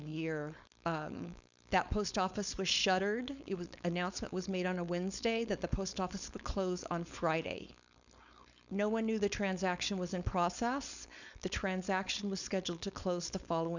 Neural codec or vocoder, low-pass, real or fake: codec, 16 kHz, 4.8 kbps, FACodec; 7.2 kHz; fake